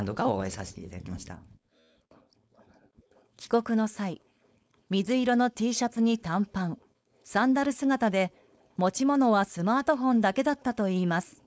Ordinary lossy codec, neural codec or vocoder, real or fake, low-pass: none; codec, 16 kHz, 4.8 kbps, FACodec; fake; none